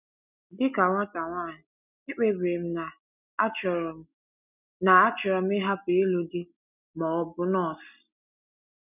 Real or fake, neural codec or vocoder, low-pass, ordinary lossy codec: real; none; 3.6 kHz; none